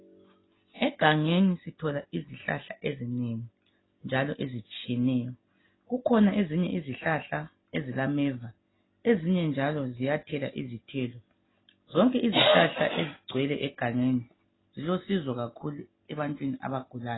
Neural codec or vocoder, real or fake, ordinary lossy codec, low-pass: none; real; AAC, 16 kbps; 7.2 kHz